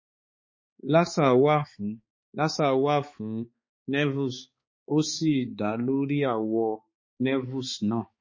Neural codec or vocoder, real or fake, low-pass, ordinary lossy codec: codec, 16 kHz, 4 kbps, X-Codec, HuBERT features, trained on balanced general audio; fake; 7.2 kHz; MP3, 32 kbps